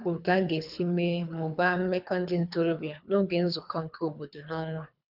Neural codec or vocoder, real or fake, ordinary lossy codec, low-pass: codec, 24 kHz, 3 kbps, HILCodec; fake; none; 5.4 kHz